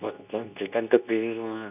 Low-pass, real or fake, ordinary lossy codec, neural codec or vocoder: 3.6 kHz; fake; none; codec, 24 kHz, 0.9 kbps, WavTokenizer, medium speech release version 2